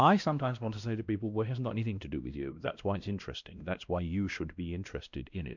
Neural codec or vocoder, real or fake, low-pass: codec, 16 kHz, 1 kbps, X-Codec, WavLM features, trained on Multilingual LibriSpeech; fake; 7.2 kHz